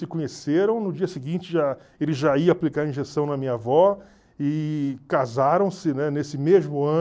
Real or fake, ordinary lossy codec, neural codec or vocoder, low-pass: real; none; none; none